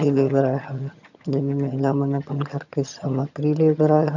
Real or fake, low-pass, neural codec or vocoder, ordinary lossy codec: fake; 7.2 kHz; vocoder, 22.05 kHz, 80 mel bands, HiFi-GAN; none